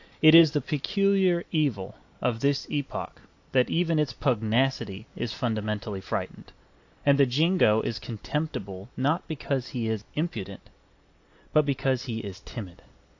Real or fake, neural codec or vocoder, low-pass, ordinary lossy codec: real; none; 7.2 kHz; AAC, 48 kbps